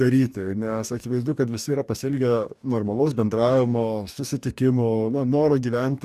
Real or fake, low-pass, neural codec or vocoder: fake; 14.4 kHz; codec, 44.1 kHz, 2.6 kbps, DAC